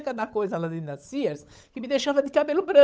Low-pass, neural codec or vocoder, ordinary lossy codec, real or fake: none; codec, 16 kHz, 8 kbps, FunCodec, trained on Chinese and English, 25 frames a second; none; fake